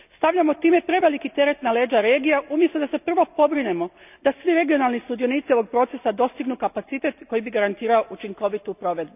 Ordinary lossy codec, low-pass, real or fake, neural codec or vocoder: none; 3.6 kHz; real; none